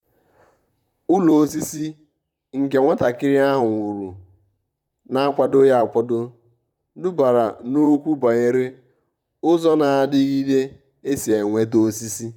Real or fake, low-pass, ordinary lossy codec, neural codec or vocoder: fake; 19.8 kHz; none; vocoder, 44.1 kHz, 128 mel bands, Pupu-Vocoder